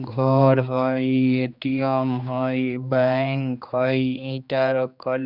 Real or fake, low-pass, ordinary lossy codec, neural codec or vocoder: fake; 5.4 kHz; none; codec, 16 kHz, 2 kbps, X-Codec, HuBERT features, trained on general audio